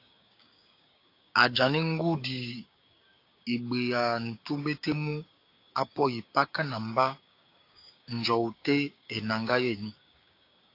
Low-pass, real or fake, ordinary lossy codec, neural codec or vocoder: 5.4 kHz; fake; AAC, 32 kbps; codec, 16 kHz, 6 kbps, DAC